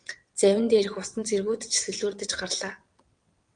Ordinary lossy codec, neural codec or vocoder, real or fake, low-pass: Opus, 32 kbps; vocoder, 22.05 kHz, 80 mel bands, WaveNeXt; fake; 9.9 kHz